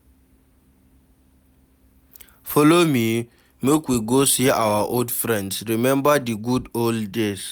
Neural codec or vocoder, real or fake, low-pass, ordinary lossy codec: none; real; none; none